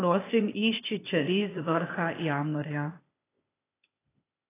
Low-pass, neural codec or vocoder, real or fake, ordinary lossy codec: 3.6 kHz; codec, 16 kHz, 0.8 kbps, ZipCodec; fake; AAC, 16 kbps